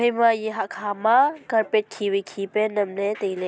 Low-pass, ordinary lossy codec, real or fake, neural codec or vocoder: none; none; real; none